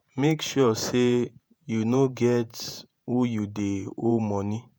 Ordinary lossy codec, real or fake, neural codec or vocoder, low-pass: none; fake; vocoder, 48 kHz, 128 mel bands, Vocos; none